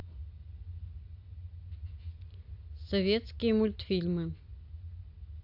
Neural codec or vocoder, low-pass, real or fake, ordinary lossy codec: none; 5.4 kHz; real; AAC, 32 kbps